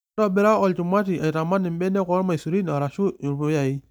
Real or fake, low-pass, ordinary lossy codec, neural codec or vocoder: real; none; none; none